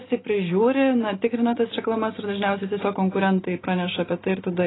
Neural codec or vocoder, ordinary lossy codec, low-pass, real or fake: none; AAC, 16 kbps; 7.2 kHz; real